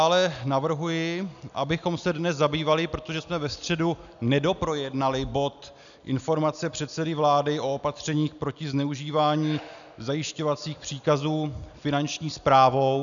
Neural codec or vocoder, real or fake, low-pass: none; real; 7.2 kHz